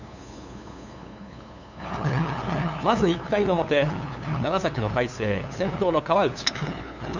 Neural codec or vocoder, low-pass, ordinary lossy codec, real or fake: codec, 16 kHz, 2 kbps, FunCodec, trained on LibriTTS, 25 frames a second; 7.2 kHz; none; fake